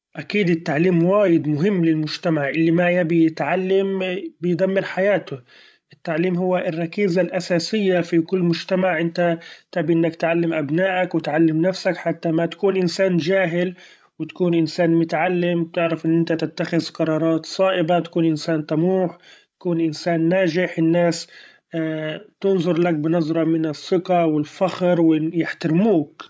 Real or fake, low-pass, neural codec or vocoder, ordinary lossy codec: fake; none; codec, 16 kHz, 16 kbps, FreqCodec, larger model; none